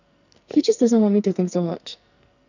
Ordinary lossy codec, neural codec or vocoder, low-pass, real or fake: none; codec, 44.1 kHz, 2.6 kbps, SNAC; 7.2 kHz; fake